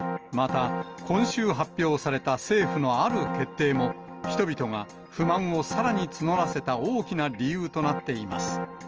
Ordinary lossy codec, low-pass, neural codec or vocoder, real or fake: Opus, 24 kbps; 7.2 kHz; none; real